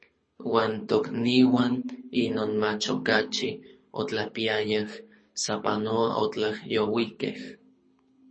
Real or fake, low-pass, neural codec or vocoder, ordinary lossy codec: fake; 9.9 kHz; codec, 24 kHz, 6 kbps, HILCodec; MP3, 32 kbps